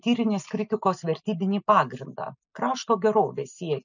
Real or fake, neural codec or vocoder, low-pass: real; none; 7.2 kHz